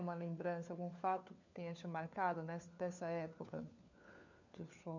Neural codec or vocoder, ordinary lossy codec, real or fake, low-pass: codec, 16 kHz, 4 kbps, FunCodec, trained on LibriTTS, 50 frames a second; none; fake; 7.2 kHz